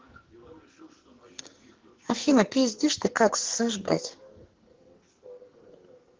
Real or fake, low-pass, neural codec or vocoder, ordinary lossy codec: fake; 7.2 kHz; codec, 44.1 kHz, 2.6 kbps, SNAC; Opus, 16 kbps